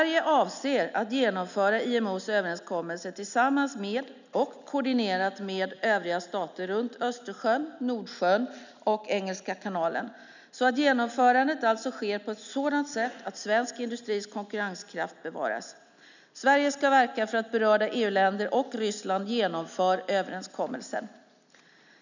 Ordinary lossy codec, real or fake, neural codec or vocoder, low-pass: none; real; none; 7.2 kHz